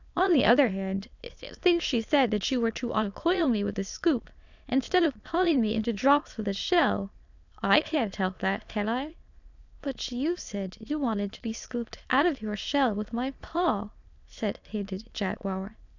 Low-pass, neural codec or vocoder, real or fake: 7.2 kHz; autoencoder, 22.05 kHz, a latent of 192 numbers a frame, VITS, trained on many speakers; fake